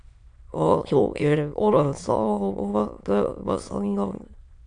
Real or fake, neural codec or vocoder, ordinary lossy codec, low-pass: fake; autoencoder, 22.05 kHz, a latent of 192 numbers a frame, VITS, trained on many speakers; MP3, 64 kbps; 9.9 kHz